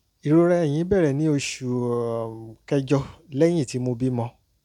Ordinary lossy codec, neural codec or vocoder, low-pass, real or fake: none; none; 19.8 kHz; real